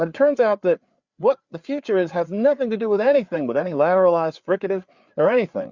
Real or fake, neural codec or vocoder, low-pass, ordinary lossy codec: fake; codec, 16 kHz, 4 kbps, FreqCodec, larger model; 7.2 kHz; Opus, 64 kbps